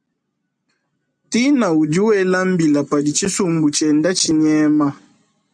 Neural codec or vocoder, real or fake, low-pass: none; real; 9.9 kHz